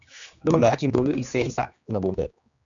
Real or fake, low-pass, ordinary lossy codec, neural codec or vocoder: fake; 7.2 kHz; MP3, 64 kbps; codec, 16 kHz, 2 kbps, X-Codec, HuBERT features, trained on general audio